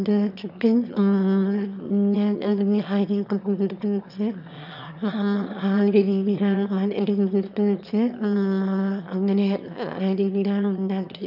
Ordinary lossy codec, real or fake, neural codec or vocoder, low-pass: none; fake; autoencoder, 22.05 kHz, a latent of 192 numbers a frame, VITS, trained on one speaker; 5.4 kHz